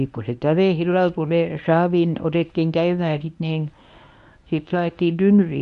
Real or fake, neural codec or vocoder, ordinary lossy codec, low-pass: fake; codec, 24 kHz, 0.9 kbps, WavTokenizer, medium speech release version 1; none; 10.8 kHz